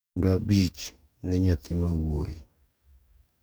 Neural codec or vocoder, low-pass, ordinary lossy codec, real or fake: codec, 44.1 kHz, 2.6 kbps, DAC; none; none; fake